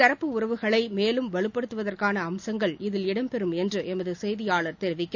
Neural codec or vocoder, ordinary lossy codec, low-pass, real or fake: none; none; 7.2 kHz; real